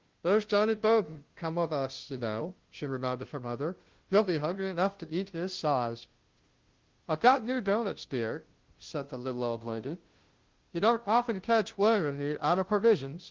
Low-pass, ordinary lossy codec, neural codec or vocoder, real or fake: 7.2 kHz; Opus, 16 kbps; codec, 16 kHz, 0.5 kbps, FunCodec, trained on Chinese and English, 25 frames a second; fake